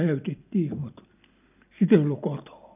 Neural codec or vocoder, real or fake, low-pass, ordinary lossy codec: none; real; 3.6 kHz; none